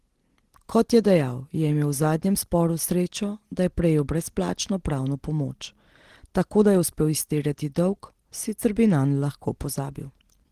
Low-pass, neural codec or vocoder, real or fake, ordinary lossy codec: 14.4 kHz; none; real; Opus, 16 kbps